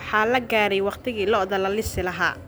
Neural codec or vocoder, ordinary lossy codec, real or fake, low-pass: none; none; real; none